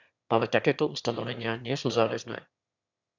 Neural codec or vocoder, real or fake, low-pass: autoencoder, 22.05 kHz, a latent of 192 numbers a frame, VITS, trained on one speaker; fake; 7.2 kHz